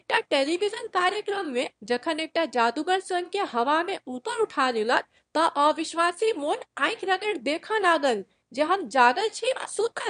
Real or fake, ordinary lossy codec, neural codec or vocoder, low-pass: fake; MP3, 64 kbps; autoencoder, 22.05 kHz, a latent of 192 numbers a frame, VITS, trained on one speaker; 9.9 kHz